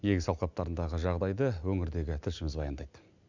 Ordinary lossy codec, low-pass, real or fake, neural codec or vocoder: none; 7.2 kHz; real; none